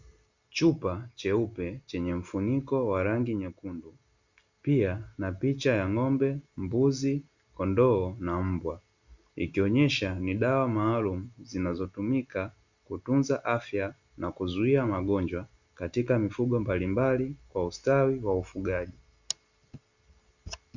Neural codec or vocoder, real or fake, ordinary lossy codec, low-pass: none; real; Opus, 64 kbps; 7.2 kHz